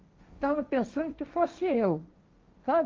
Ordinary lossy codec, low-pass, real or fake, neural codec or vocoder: Opus, 32 kbps; 7.2 kHz; fake; codec, 16 kHz, 1.1 kbps, Voila-Tokenizer